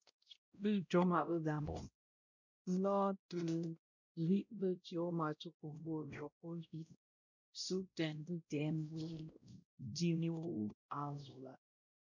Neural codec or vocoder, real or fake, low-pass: codec, 16 kHz, 0.5 kbps, X-Codec, WavLM features, trained on Multilingual LibriSpeech; fake; 7.2 kHz